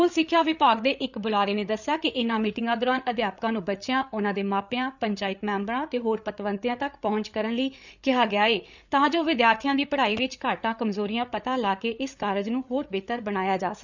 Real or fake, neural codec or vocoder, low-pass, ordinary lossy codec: fake; codec, 16 kHz, 8 kbps, FreqCodec, larger model; 7.2 kHz; none